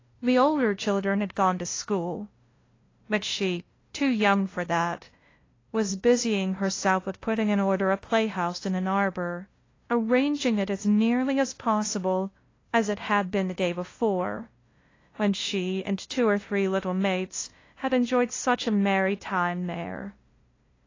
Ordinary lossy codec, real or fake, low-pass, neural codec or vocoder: AAC, 32 kbps; fake; 7.2 kHz; codec, 16 kHz, 0.5 kbps, FunCodec, trained on LibriTTS, 25 frames a second